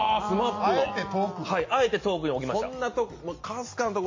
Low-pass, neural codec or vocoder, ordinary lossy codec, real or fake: 7.2 kHz; none; MP3, 32 kbps; real